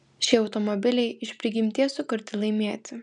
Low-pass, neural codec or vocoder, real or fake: 10.8 kHz; none; real